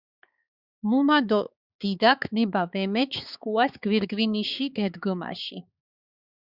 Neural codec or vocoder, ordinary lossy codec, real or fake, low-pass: codec, 16 kHz, 4 kbps, X-Codec, HuBERT features, trained on balanced general audio; Opus, 64 kbps; fake; 5.4 kHz